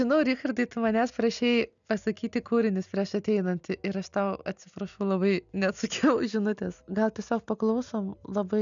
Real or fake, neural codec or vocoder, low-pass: real; none; 7.2 kHz